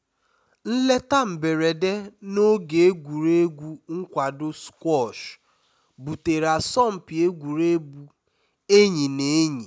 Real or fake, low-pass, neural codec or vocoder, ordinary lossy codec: real; none; none; none